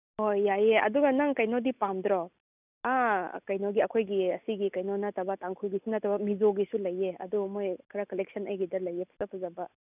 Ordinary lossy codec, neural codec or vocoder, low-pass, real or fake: none; none; 3.6 kHz; real